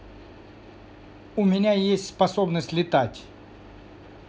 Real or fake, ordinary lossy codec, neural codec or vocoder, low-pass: real; none; none; none